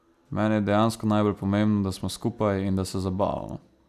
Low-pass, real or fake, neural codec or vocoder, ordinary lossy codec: 14.4 kHz; real; none; none